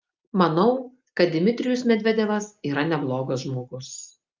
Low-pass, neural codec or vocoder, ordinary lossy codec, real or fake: 7.2 kHz; none; Opus, 24 kbps; real